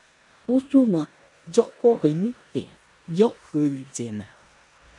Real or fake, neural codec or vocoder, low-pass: fake; codec, 16 kHz in and 24 kHz out, 0.9 kbps, LongCat-Audio-Codec, four codebook decoder; 10.8 kHz